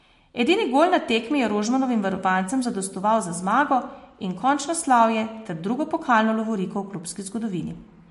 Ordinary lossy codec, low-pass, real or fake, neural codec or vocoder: MP3, 48 kbps; 14.4 kHz; real; none